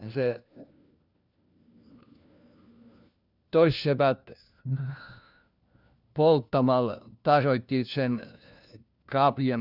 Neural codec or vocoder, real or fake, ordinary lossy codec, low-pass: codec, 16 kHz, 1 kbps, FunCodec, trained on LibriTTS, 50 frames a second; fake; none; 5.4 kHz